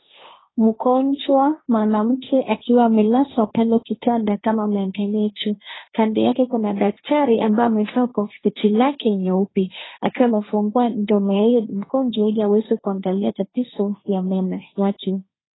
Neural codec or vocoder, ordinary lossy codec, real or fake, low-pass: codec, 16 kHz, 1.1 kbps, Voila-Tokenizer; AAC, 16 kbps; fake; 7.2 kHz